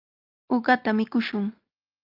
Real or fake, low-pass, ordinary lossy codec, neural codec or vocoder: real; 5.4 kHz; Opus, 32 kbps; none